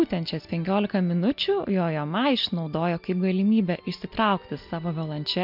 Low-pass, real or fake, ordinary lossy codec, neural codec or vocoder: 5.4 kHz; real; MP3, 48 kbps; none